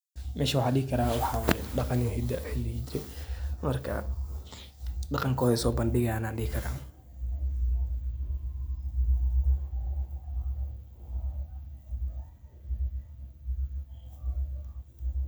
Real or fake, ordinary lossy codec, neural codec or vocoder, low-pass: real; none; none; none